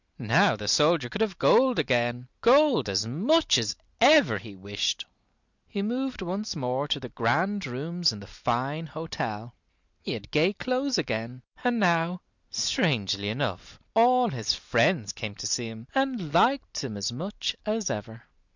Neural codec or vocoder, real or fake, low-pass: none; real; 7.2 kHz